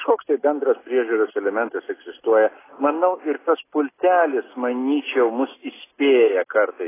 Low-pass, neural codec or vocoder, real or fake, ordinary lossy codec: 3.6 kHz; none; real; AAC, 16 kbps